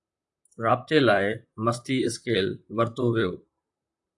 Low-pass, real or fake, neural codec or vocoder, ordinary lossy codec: 10.8 kHz; fake; vocoder, 44.1 kHz, 128 mel bands, Pupu-Vocoder; AAC, 64 kbps